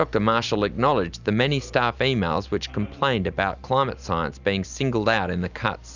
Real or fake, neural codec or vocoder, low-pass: real; none; 7.2 kHz